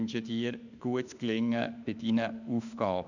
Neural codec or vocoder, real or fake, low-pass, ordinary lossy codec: autoencoder, 48 kHz, 32 numbers a frame, DAC-VAE, trained on Japanese speech; fake; 7.2 kHz; none